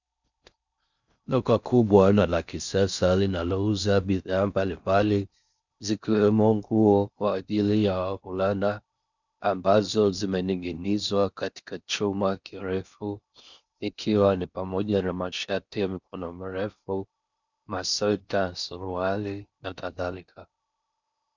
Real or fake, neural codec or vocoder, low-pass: fake; codec, 16 kHz in and 24 kHz out, 0.6 kbps, FocalCodec, streaming, 4096 codes; 7.2 kHz